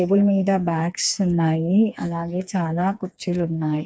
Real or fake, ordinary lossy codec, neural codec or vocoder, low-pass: fake; none; codec, 16 kHz, 4 kbps, FreqCodec, smaller model; none